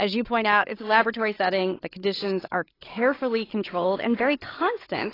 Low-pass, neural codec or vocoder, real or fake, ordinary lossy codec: 5.4 kHz; codec, 16 kHz, 8 kbps, FreqCodec, larger model; fake; AAC, 24 kbps